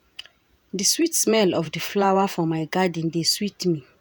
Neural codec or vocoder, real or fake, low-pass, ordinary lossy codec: vocoder, 48 kHz, 128 mel bands, Vocos; fake; none; none